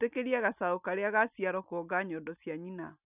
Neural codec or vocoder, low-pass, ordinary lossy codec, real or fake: none; 3.6 kHz; none; real